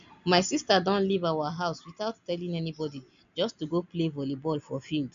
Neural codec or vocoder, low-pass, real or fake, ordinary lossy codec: none; 7.2 kHz; real; MP3, 64 kbps